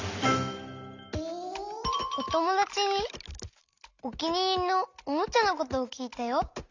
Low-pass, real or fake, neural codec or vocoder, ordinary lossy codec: 7.2 kHz; real; none; Opus, 64 kbps